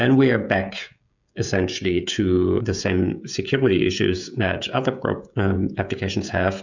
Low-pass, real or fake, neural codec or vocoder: 7.2 kHz; fake; codec, 16 kHz, 16 kbps, FreqCodec, smaller model